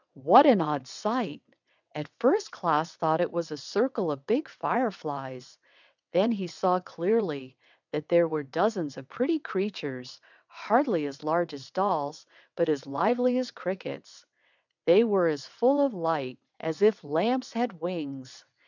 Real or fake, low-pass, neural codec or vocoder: fake; 7.2 kHz; vocoder, 22.05 kHz, 80 mel bands, WaveNeXt